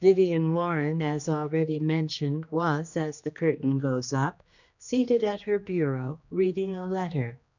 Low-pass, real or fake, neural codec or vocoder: 7.2 kHz; fake; codec, 16 kHz, 2 kbps, X-Codec, HuBERT features, trained on general audio